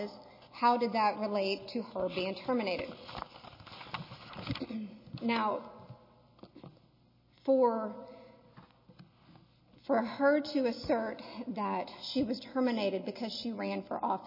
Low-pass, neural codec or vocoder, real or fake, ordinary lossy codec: 5.4 kHz; none; real; MP3, 24 kbps